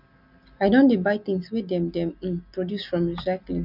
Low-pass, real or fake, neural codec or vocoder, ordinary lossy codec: 5.4 kHz; real; none; none